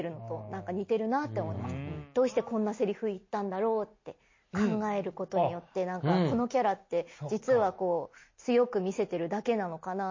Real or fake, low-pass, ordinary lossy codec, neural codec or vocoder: real; 7.2 kHz; MP3, 32 kbps; none